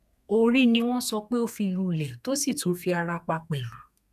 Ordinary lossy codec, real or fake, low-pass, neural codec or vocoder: none; fake; 14.4 kHz; codec, 32 kHz, 1.9 kbps, SNAC